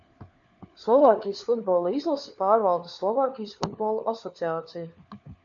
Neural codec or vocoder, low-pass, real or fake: codec, 16 kHz, 4 kbps, FunCodec, trained on LibriTTS, 50 frames a second; 7.2 kHz; fake